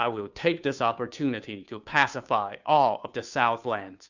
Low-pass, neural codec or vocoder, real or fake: 7.2 kHz; codec, 16 kHz, 2 kbps, FunCodec, trained on Chinese and English, 25 frames a second; fake